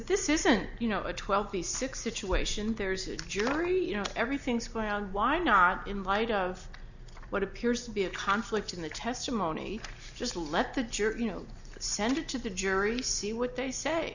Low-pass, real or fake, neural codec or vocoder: 7.2 kHz; real; none